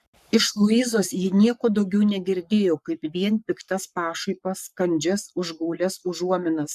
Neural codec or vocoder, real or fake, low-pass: codec, 44.1 kHz, 7.8 kbps, Pupu-Codec; fake; 14.4 kHz